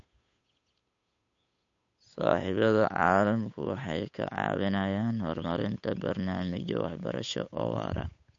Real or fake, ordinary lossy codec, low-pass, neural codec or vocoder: fake; MP3, 48 kbps; 7.2 kHz; codec, 16 kHz, 8 kbps, FunCodec, trained on Chinese and English, 25 frames a second